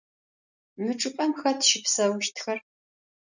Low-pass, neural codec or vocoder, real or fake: 7.2 kHz; none; real